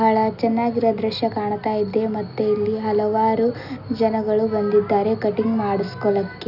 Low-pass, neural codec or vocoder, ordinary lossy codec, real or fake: 5.4 kHz; none; none; real